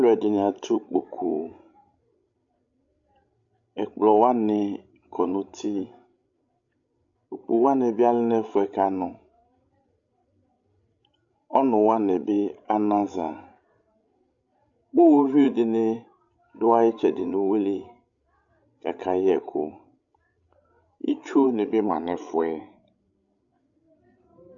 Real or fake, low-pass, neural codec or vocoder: fake; 7.2 kHz; codec, 16 kHz, 16 kbps, FreqCodec, larger model